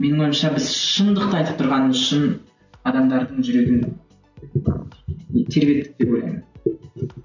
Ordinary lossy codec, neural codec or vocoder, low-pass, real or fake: none; none; 7.2 kHz; real